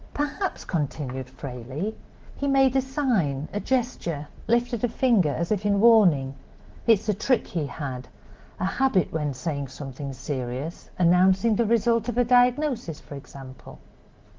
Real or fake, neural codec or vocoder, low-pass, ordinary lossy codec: real; none; 7.2 kHz; Opus, 16 kbps